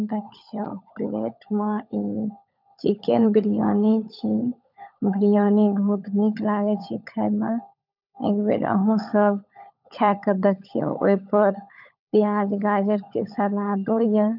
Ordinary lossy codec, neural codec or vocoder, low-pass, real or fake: none; codec, 16 kHz, 16 kbps, FunCodec, trained on LibriTTS, 50 frames a second; 5.4 kHz; fake